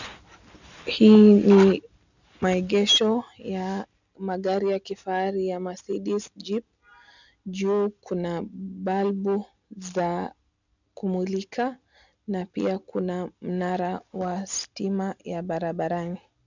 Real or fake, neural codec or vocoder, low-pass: real; none; 7.2 kHz